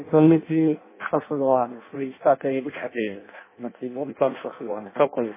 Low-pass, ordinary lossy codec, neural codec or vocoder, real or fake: 3.6 kHz; MP3, 16 kbps; codec, 16 kHz in and 24 kHz out, 0.6 kbps, FireRedTTS-2 codec; fake